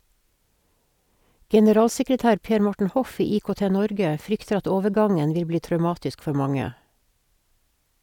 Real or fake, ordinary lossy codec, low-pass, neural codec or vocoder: real; none; 19.8 kHz; none